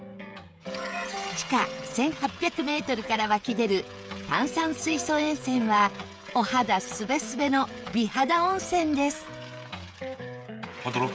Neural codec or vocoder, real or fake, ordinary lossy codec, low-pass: codec, 16 kHz, 16 kbps, FreqCodec, smaller model; fake; none; none